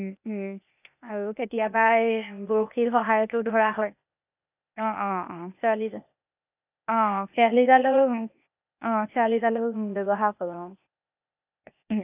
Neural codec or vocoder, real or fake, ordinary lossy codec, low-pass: codec, 16 kHz, 0.8 kbps, ZipCodec; fake; none; 3.6 kHz